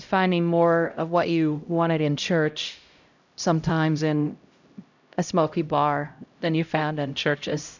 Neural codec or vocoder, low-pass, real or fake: codec, 16 kHz, 0.5 kbps, X-Codec, HuBERT features, trained on LibriSpeech; 7.2 kHz; fake